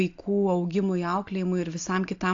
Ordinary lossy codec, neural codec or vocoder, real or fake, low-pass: AAC, 48 kbps; none; real; 7.2 kHz